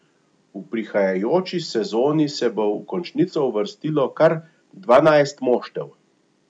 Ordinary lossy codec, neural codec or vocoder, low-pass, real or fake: none; none; 9.9 kHz; real